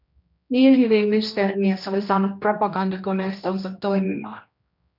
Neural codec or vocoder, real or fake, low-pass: codec, 16 kHz, 1 kbps, X-Codec, HuBERT features, trained on general audio; fake; 5.4 kHz